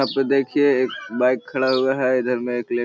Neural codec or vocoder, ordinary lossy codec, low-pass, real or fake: none; none; none; real